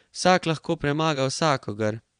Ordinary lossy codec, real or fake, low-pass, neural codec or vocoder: none; fake; 9.9 kHz; vocoder, 22.05 kHz, 80 mel bands, Vocos